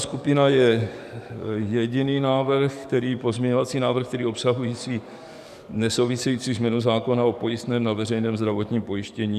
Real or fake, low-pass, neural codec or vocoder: fake; 14.4 kHz; codec, 44.1 kHz, 7.8 kbps, DAC